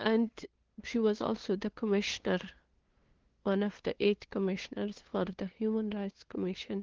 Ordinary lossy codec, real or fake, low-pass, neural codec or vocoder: Opus, 16 kbps; fake; 7.2 kHz; codec, 16 kHz, 2 kbps, FunCodec, trained on LibriTTS, 25 frames a second